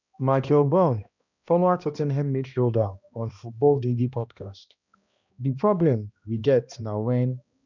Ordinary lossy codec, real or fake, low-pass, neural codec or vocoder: none; fake; 7.2 kHz; codec, 16 kHz, 1 kbps, X-Codec, HuBERT features, trained on balanced general audio